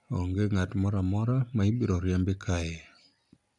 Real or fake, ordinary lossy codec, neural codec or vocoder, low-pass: real; none; none; 10.8 kHz